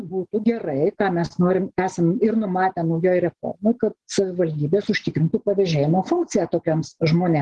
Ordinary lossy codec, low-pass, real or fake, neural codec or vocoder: Opus, 16 kbps; 10.8 kHz; real; none